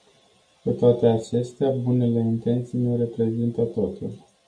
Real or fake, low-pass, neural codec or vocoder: real; 9.9 kHz; none